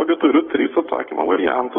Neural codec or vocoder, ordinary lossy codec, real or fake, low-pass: autoencoder, 48 kHz, 128 numbers a frame, DAC-VAE, trained on Japanese speech; AAC, 16 kbps; fake; 19.8 kHz